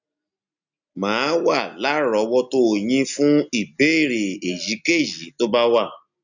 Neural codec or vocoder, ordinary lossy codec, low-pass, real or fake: none; none; 7.2 kHz; real